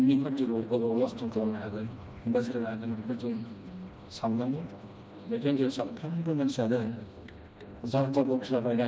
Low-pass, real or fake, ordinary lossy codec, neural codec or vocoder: none; fake; none; codec, 16 kHz, 1 kbps, FreqCodec, smaller model